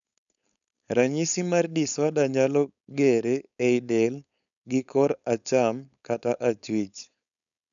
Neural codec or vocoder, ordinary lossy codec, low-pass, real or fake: codec, 16 kHz, 4.8 kbps, FACodec; none; 7.2 kHz; fake